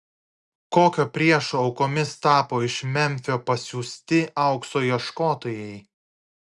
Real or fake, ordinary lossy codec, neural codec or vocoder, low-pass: real; Opus, 64 kbps; none; 10.8 kHz